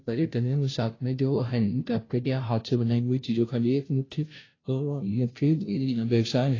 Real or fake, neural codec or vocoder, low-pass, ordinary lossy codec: fake; codec, 16 kHz, 0.5 kbps, FunCodec, trained on Chinese and English, 25 frames a second; 7.2 kHz; AAC, 32 kbps